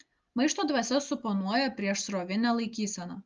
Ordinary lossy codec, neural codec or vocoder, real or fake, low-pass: Opus, 32 kbps; none; real; 7.2 kHz